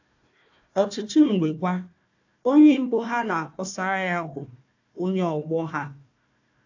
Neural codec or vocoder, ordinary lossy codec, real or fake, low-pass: codec, 16 kHz, 1 kbps, FunCodec, trained on Chinese and English, 50 frames a second; AAC, 48 kbps; fake; 7.2 kHz